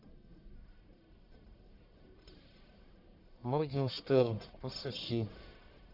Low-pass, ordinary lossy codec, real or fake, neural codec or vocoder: 5.4 kHz; none; fake; codec, 44.1 kHz, 1.7 kbps, Pupu-Codec